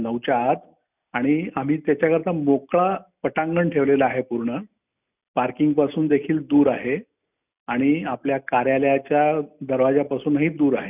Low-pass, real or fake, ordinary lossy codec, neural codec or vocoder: 3.6 kHz; real; none; none